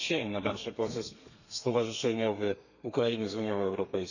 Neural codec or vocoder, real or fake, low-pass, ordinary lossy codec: codec, 32 kHz, 1.9 kbps, SNAC; fake; 7.2 kHz; none